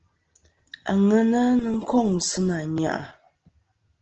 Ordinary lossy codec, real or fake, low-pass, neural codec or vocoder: Opus, 16 kbps; real; 7.2 kHz; none